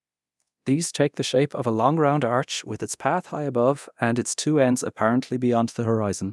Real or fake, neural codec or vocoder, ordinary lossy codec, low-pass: fake; codec, 24 kHz, 0.9 kbps, DualCodec; none; none